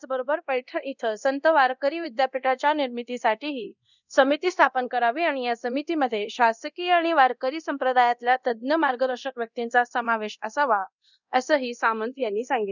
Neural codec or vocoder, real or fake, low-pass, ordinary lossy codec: codec, 24 kHz, 0.9 kbps, DualCodec; fake; 7.2 kHz; none